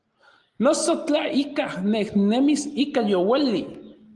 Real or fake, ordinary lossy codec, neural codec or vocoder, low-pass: real; Opus, 24 kbps; none; 10.8 kHz